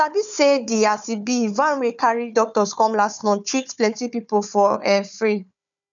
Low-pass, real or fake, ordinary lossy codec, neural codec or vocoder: 7.2 kHz; fake; none; codec, 16 kHz, 4 kbps, FunCodec, trained on Chinese and English, 50 frames a second